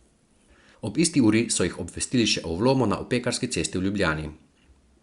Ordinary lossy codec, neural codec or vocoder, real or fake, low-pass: Opus, 64 kbps; none; real; 10.8 kHz